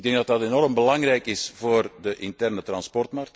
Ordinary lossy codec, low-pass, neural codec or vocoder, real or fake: none; none; none; real